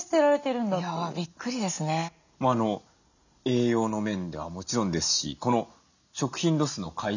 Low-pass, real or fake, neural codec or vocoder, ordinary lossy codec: 7.2 kHz; real; none; none